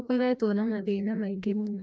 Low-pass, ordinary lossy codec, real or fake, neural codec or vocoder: none; none; fake; codec, 16 kHz, 1 kbps, FreqCodec, larger model